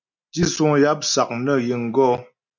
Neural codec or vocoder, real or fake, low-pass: none; real; 7.2 kHz